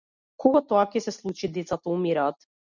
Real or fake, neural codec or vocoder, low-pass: real; none; 7.2 kHz